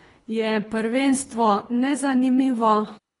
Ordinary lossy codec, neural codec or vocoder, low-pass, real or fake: AAC, 32 kbps; codec, 24 kHz, 3 kbps, HILCodec; 10.8 kHz; fake